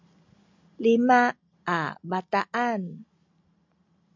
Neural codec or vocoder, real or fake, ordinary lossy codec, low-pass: none; real; MP3, 64 kbps; 7.2 kHz